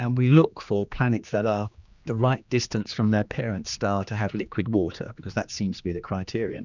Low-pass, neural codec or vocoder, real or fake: 7.2 kHz; codec, 16 kHz, 2 kbps, X-Codec, HuBERT features, trained on general audio; fake